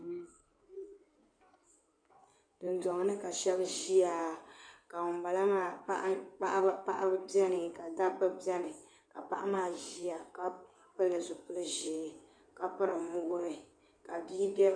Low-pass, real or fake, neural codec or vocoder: 9.9 kHz; fake; codec, 16 kHz in and 24 kHz out, 2.2 kbps, FireRedTTS-2 codec